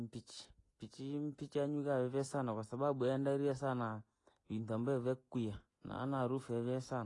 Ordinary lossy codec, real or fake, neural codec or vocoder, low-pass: AAC, 32 kbps; real; none; 10.8 kHz